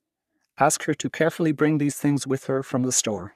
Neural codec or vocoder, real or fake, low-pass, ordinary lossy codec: codec, 44.1 kHz, 3.4 kbps, Pupu-Codec; fake; 14.4 kHz; none